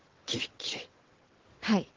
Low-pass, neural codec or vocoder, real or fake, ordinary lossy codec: 7.2 kHz; vocoder, 22.05 kHz, 80 mel bands, WaveNeXt; fake; Opus, 16 kbps